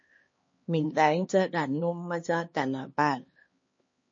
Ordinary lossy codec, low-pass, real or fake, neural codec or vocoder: MP3, 32 kbps; 7.2 kHz; fake; codec, 16 kHz, 2 kbps, X-Codec, HuBERT features, trained on LibriSpeech